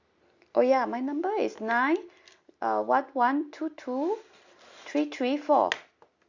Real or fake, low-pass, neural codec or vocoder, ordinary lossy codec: real; 7.2 kHz; none; Opus, 64 kbps